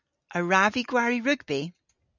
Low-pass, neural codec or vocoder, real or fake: 7.2 kHz; none; real